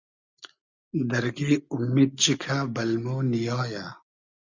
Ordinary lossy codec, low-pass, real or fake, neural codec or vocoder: Opus, 64 kbps; 7.2 kHz; real; none